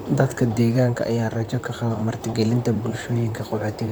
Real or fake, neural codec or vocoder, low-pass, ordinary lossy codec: fake; vocoder, 44.1 kHz, 128 mel bands, Pupu-Vocoder; none; none